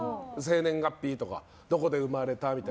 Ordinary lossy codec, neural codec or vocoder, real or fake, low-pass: none; none; real; none